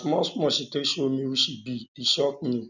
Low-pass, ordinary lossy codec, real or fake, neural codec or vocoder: 7.2 kHz; none; real; none